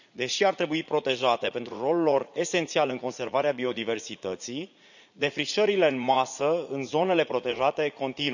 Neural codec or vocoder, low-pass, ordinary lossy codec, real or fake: vocoder, 44.1 kHz, 80 mel bands, Vocos; 7.2 kHz; none; fake